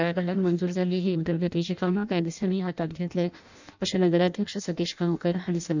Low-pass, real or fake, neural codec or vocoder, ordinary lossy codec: 7.2 kHz; fake; codec, 16 kHz in and 24 kHz out, 0.6 kbps, FireRedTTS-2 codec; none